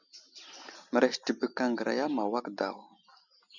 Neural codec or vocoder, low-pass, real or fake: none; 7.2 kHz; real